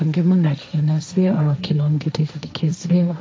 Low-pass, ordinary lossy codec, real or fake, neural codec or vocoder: none; none; fake; codec, 16 kHz, 1.1 kbps, Voila-Tokenizer